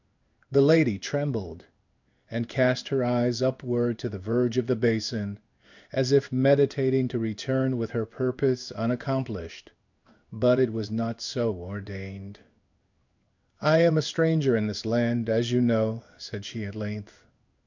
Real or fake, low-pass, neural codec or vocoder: fake; 7.2 kHz; codec, 16 kHz in and 24 kHz out, 1 kbps, XY-Tokenizer